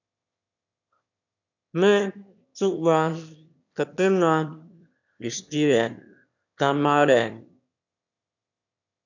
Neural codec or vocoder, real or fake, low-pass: autoencoder, 22.05 kHz, a latent of 192 numbers a frame, VITS, trained on one speaker; fake; 7.2 kHz